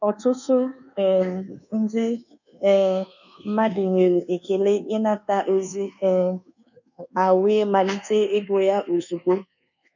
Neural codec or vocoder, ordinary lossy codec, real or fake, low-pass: codec, 24 kHz, 1.2 kbps, DualCodec; none; fake; 7.2 kHz